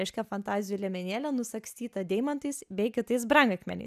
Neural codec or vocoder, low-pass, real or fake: none; 14.4 kHz; real